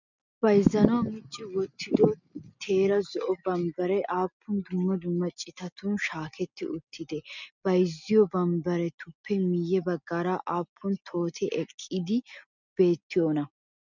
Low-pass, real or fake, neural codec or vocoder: 7.2 kHz; real; none